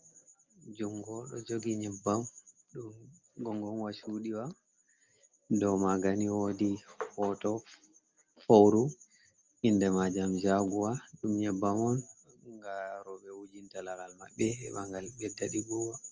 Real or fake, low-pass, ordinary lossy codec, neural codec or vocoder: real; 7.2 kHz; Opus, 24 kbps; none